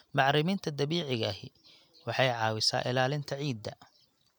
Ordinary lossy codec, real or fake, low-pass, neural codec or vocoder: none; real; 19.8 kHz; none